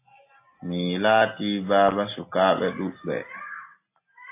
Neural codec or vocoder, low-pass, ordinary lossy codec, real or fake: none; 3.6 kHz; AAC, 24 kbps; real